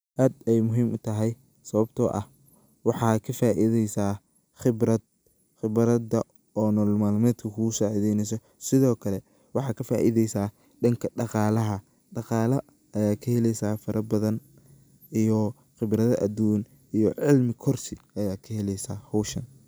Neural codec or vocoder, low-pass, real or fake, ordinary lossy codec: none; none; real; none